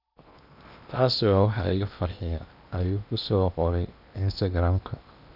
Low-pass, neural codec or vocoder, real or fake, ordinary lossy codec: 5.4 kHz; codec, 16 kHz in and 24 kHz out, 0.8 kbps, FocalCodec, streaming, 65536 codes; fake; none